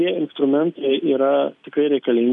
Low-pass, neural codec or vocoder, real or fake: 10.8 kHz; none; real